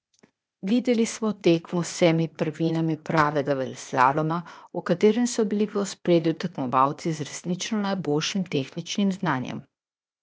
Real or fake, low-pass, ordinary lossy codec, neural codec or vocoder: fake; none; none; codec, 16 kHz, 0.8 kbps, ZipCodec